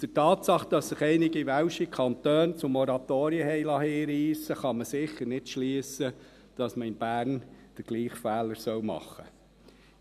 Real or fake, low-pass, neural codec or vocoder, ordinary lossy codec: real; 14.4 kHz; none; none